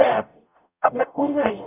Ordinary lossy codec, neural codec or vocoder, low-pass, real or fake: none; codec, 44.1 kHz, 0.9 kbps, DAC; 3.6 kHz; fake